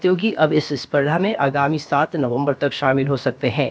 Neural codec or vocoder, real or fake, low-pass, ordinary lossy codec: codec, 16 kHz, about 1 kbps, DyCAST, with the encoder's durations; fake; none; none